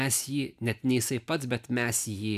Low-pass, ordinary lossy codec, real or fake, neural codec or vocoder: 14.4 kHz; AAC, 96 kbps; real; none